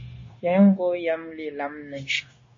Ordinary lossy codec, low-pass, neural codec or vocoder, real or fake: MP3, 32 kbps; 7.2 kHz; codec, 16 kHz, 0.9 kbps, LongCat-Audio-Codec; fake